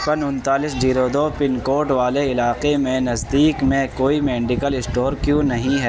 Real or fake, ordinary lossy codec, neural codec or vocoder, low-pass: real; none; none; none